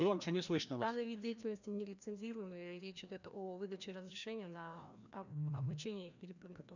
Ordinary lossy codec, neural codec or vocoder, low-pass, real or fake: none; codec, 16 kHz, 1 kbps, FreqCodec, larger model; 7.2 kHz; fake